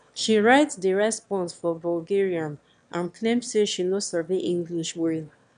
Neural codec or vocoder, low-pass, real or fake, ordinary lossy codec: autoencoder, 22.05 kHz, a latent of 192 numbers a frame, VITS, trained on one speaker; 9.9 kHz; fake; none